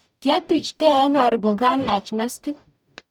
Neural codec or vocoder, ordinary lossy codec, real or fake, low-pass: codec, 44.1 kHz, 0.9 kbps, DAC; none; fake; 19.8 kHz